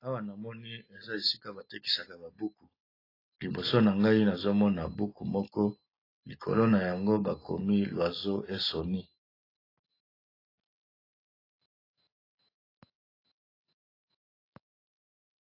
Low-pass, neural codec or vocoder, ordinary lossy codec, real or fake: 5.4 kHz; none; AAC, 24 kbps; real